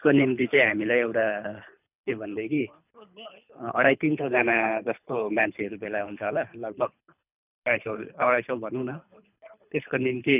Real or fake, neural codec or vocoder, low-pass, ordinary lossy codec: fake; codec, 24 kHz, 3 kbps, HILCodec; 3.6 kHz; none